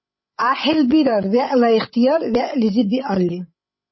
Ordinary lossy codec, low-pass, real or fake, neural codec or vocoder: MP3, 24 kbps; 7.2 kHz; fake; codec, 16 kHz, 16 kbps, FreqCodec, larger model